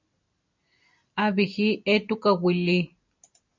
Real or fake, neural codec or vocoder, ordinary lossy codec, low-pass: real; none; MP3, 48 kbps; 7.2 kHz